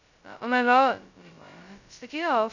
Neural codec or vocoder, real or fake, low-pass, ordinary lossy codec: codec, 16 kHz, 0.2 kbps, FocalCodec; fake; 7.2 kHz; none